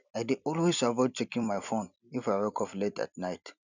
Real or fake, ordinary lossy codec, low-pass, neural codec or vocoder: fake; none; 7.2 kHz; vocoder, 44.1 kHz, 128 mel bands every 256 samples, BigVGAN v2